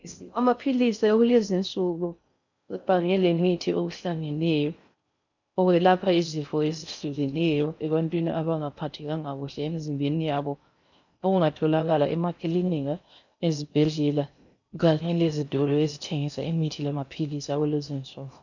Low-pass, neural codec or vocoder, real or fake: 7.2 kHz; codec, 16 kHz in and 24 kHz out, 0.6 kbps, FocalCodec, streaming, 4096 codes; fake